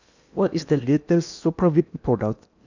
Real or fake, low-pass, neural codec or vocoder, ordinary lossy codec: fake; 7.2 kHz; codec, 16 kHz in and 24 kHz out, 0.8 kbps, FocalCodec, streaming, 65536 codes; none